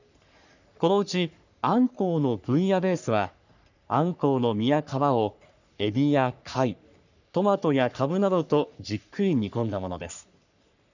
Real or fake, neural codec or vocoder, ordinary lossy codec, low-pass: fake; codec, 44.1 kHz, 3.4 kbps, Pupu-Codec; none; 7.2 kHz